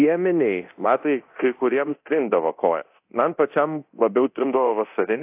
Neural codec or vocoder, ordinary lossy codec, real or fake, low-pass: codec, 24 kHz, 0.9 kbps, DualCodec; AAC, 32 kbps; fake; 3.6 kHz